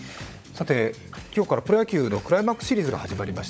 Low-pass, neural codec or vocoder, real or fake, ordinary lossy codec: none; codec, 16 kHz, 16 kbps, FunCodec, trained on LibriTTS, 50 frames a second; fake; none